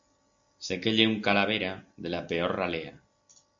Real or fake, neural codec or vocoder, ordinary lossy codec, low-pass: real; none; AAC, 64 kbps; 7.2 kHz